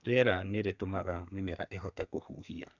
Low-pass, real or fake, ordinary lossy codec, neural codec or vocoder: 7.2 kHz; fake; none; codec, 32 kHz, 1.9 kbps, SNAC